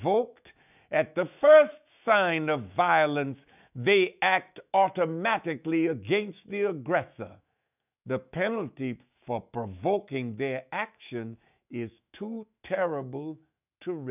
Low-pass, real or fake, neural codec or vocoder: 3.6 kHz; fake; autoencoder, 48 kHz, 128 numbers a frame, DAC-VAE, trained on Japanese speech